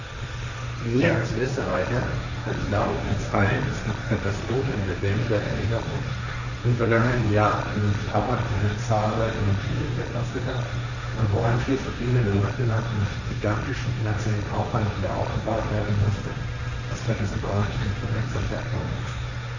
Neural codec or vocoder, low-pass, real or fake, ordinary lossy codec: codec, 16 kHz, 1.1 kbps, Voila-Tokenizer; 7.2 kHz; fake; none